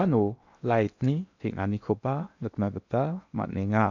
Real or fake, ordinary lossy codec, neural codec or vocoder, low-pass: fake; none; codec, 16 kHz in and 24 kHz out, 0.8 kbps, FocalCodec, streaming, 65536 codes; 7.2 kHz